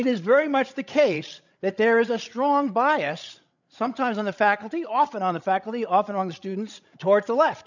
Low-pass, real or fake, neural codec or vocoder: 7.2 kHz; fake; codec, 16 kHz, 16 kbps, FreqCodec, larger model